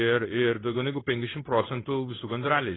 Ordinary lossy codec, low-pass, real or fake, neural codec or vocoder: AAC, 16 kbps; 7.2 kHz; fake; codec, 16 kHz in and 24 kHz out, 1 kbps, XY-Tokenizer